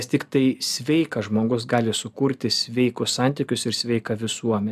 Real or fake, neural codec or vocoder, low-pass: real; none; 14.4 kHz